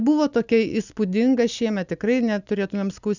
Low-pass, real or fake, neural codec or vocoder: 7.2 kHz; real; none